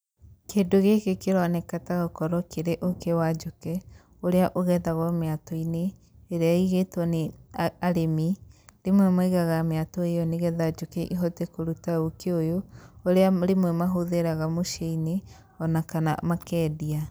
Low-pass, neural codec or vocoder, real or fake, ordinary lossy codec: none; none; real; none